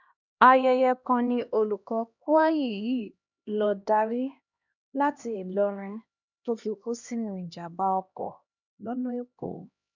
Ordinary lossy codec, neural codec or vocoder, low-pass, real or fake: none; codec, 16 kHz, 1 kbps, X-Codec, HuBERT features, trained on LibriSpeech; 7.2 kHz; fake